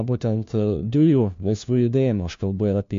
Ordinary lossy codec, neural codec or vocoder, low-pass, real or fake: MP3, 48 kbps; codec, 16 kHz, 1 kbps, FunCodec, trained on LibriTTS, 50 frames a second; 7.2 kHz; fake